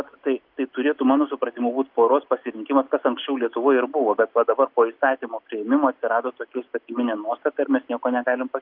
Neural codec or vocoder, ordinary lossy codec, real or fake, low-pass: none; Opus, 24 kbps; real; 5.4 kHz